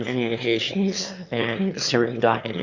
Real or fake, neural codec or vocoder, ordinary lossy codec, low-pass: fake; autoencoder, 22.05 kHz, a latent of 192 numbers a frame, VITS, trained on one speaker; Opus, 64 kbps; 7.2 kHz